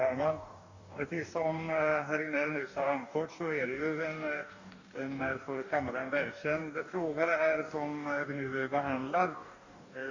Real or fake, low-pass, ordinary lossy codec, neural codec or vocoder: fake; 7.2 kHz; Opus, 64 kbps; codec, 44.1 kHz, 2.6 kbps, DAC